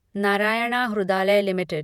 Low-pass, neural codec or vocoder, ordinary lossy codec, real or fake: 19.8 kHz; vocoder, 48 kHz, 128 mel bands, Vocos; none; fake